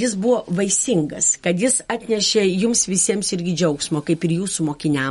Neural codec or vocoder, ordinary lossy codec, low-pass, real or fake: none; MP3, 48 kbps; 19.8 kHz; real